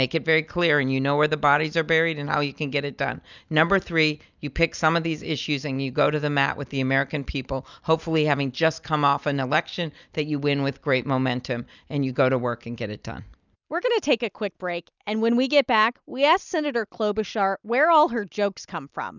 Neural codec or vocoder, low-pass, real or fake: none; 7.2 kHz; real